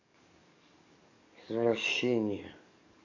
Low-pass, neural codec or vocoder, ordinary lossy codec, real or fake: 7.2 kHz; codec, 44.1 kHz, 7.8 kbps, DAC; none; fake